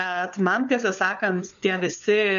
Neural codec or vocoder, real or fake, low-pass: codec, 16 kHz, 4 kbps, FunCodec, trained on LibriTTS, 50 frames a second; fake; 7.2 kHz